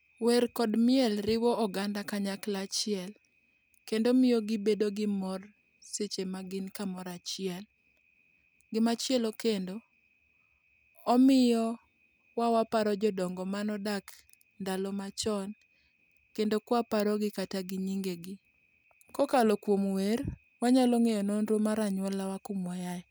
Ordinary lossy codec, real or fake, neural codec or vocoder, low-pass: none; real; none; none